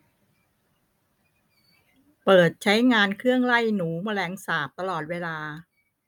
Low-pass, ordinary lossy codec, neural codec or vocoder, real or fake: 19.8 kHz; none; none; real